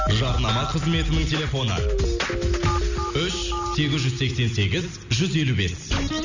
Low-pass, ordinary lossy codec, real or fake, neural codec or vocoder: 7.2 kHz; none; real; none